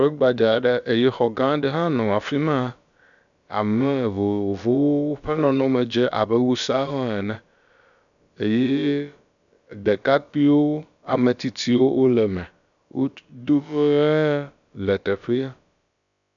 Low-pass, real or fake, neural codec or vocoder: 7.2 kHz; fake; codec, 16 kHz, about 1 kbps, DyCAST, with the encoder's durations